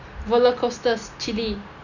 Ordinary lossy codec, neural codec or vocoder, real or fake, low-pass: none; none; real; 7.2 kHz